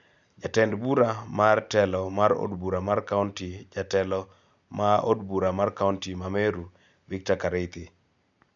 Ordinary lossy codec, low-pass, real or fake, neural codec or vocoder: none; 7.2 kHz; real; none